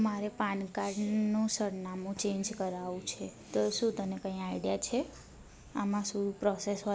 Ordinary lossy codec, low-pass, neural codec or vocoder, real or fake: none; none; none; real